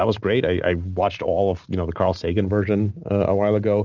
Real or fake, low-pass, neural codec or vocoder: real; 7.2 kHz; none